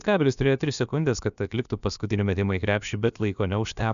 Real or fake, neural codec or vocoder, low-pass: fake; codec, 16 kHz, about 1 kbps, DyCAST, with the encoder's durations; 7.2 kHz